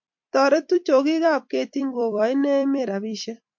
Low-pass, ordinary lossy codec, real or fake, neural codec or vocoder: 7.2 kHz; MP3, 48 kbps; fake; vocoder, 44.1 kHz, 128 mel bands every 256 samples, BigVGAN v2